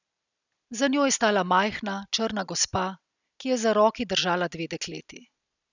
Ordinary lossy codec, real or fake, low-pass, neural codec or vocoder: none; real; 7.2 kHz; none